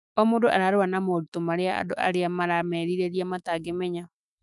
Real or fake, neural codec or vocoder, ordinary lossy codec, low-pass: fake; autoencoder, 48 kHz, 128 numbers a frame, DAC-VAE, trained on Japanese speech; none; 10.8 kHz